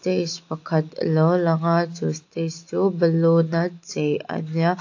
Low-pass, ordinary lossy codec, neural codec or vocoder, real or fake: 7.2 kHz; AAC, 48 kbps; none; real